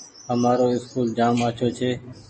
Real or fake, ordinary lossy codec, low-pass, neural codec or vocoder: real; MP3, 32 kbps; 10.8 kHz; none